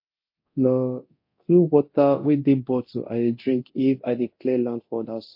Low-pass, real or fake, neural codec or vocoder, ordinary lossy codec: 5.4 kHz; fake; codec, 24 kHz, 0.9 kbps, DualCodec; MP3, 32 kbps